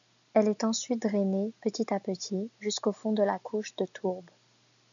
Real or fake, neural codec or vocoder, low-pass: real; none; 7.2 kHz